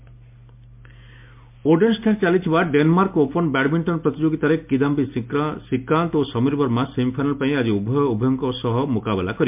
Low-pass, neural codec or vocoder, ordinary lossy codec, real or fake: 3.6 kHz; none; MP3, 32 kbps; real